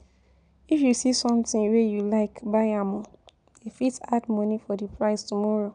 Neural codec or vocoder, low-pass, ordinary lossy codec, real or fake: none; 9.9 kHz; none; real